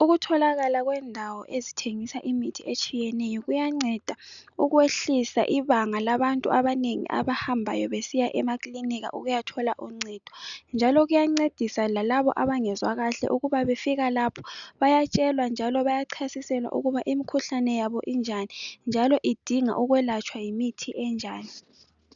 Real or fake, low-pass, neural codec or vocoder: real; 7.2 kHz; none